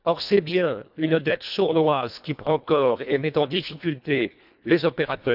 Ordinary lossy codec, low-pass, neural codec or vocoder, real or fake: none; 5.4 kHz; codec, 24 kHz, 1.5 kbps, HILCodec; fake